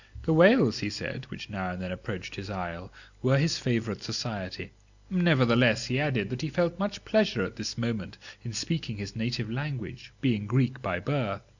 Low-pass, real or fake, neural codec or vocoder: 7.2 kHz; real; none